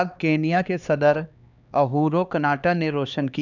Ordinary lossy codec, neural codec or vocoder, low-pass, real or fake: none; codec, 16 kHz, 2 kbps, X-Codec, HuBERT features, trained on LibriSpeech; 7.2 kHz; fake